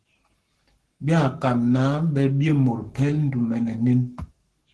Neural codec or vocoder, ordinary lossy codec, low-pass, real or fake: codec, 44.1 kHz, 7.8 kbps, Pupu-Codec; Opus, 16 kbps; 10.8 kHz; fake